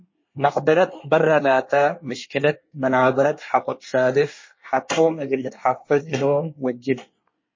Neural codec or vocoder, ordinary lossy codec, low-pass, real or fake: codec, 24 kHz, 1 kbps, SNAC; MP3, 32 kbps; 7.2 kHz; fake